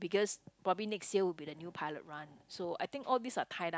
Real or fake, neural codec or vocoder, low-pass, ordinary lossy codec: real; none; none; none